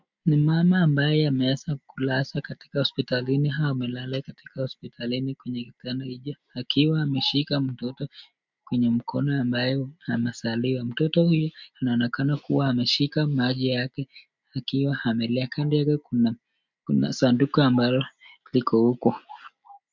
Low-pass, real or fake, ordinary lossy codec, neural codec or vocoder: 7.2 kHz; real; MP3, 64 kbps; none